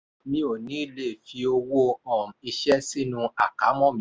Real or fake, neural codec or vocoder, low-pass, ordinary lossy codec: real; none; none; none